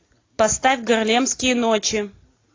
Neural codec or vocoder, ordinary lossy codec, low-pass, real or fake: none; AAC, 32 kbps; 7.2 kHz; real